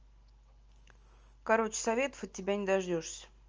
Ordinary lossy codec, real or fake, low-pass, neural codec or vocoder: Opus, 24 kbps; real; 7.2 kHz; none